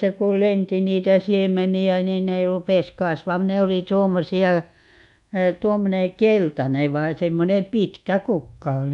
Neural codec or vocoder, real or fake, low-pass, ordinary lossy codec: codec, 24 kHz, 1.2 kbps, DualCodec; fake; 10.8 kHz; none